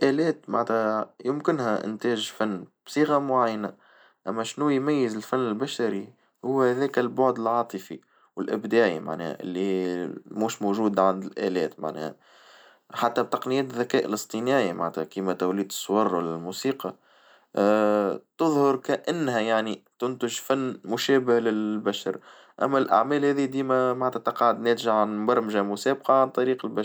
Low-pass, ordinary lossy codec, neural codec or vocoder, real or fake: none; none; none; real